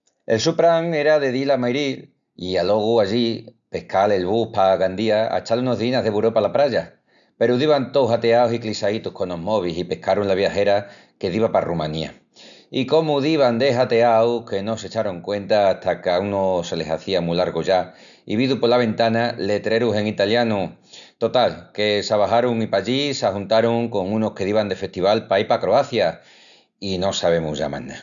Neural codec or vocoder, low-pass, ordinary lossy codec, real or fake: none; 7.2 kHz; none; real